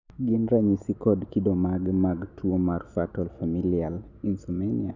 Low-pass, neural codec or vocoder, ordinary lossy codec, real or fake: 7.2 kHz; none; none; real